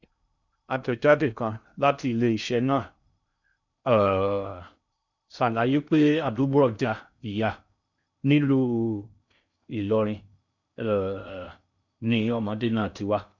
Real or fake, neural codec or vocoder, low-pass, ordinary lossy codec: fake; codec, 16 kHz in and 24 kHz out, 0.6 kbps, FocalCodec, streaming, 2048 codes; 7.2 kHz; none